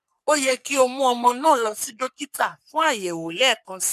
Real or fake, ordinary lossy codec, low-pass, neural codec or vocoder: fake; none; 14.4 kHz; codec, 44.1 kHz, 3.4 kbps, Pupu-Codec